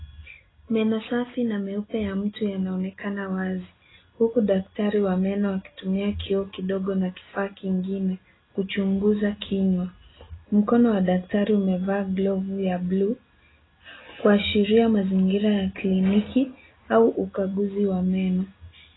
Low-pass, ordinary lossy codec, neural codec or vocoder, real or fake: 7.2 kHz; AAC, 16 kbps; none; real